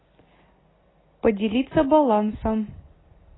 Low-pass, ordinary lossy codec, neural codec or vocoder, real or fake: 7.2 kHz; AAC, 16 kbps; none; real